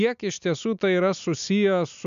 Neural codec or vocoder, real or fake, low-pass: none; real; 7.2 kHz